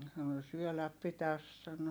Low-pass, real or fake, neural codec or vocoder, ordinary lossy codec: none; real; none; none